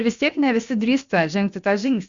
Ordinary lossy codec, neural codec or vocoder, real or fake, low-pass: Opus, 64 kbps; codec, 16 kHz, about 1 kbps, DyCAST, with the encoder's durations; fake; 7.2 kHz